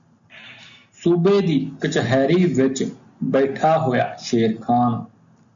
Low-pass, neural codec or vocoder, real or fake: 7.2 kHz; none; real